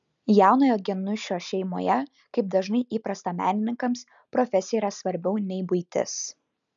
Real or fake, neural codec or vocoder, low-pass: real; none; 7.2 kHz